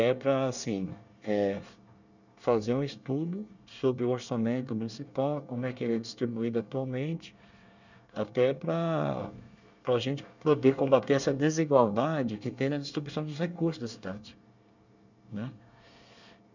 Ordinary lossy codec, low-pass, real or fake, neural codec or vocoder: none; 7.2 kHz; fake; codec, 24 kHz, 1 kbps, SNAC